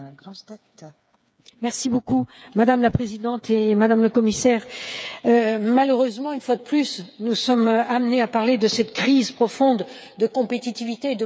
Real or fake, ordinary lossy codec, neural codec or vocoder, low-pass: fake; none; codec, 16 kHz, 8 kbps, FreqCodec, smaller model; none